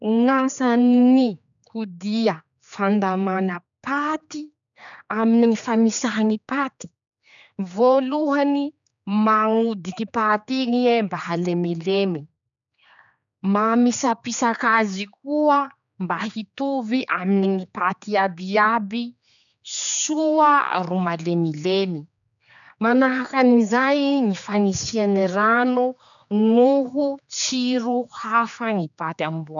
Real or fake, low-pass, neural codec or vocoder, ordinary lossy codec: fake; 7.2 kHz; codec, 16 kHz, 4 kbps, X-Codec, HuBERT features, trained on general audio; none